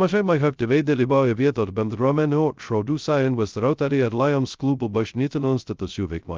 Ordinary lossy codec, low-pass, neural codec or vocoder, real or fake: Opus, 24 kbps; 7.2 kHz; codec, 16 kHz, 0.2 kbps, FocalCodec; fake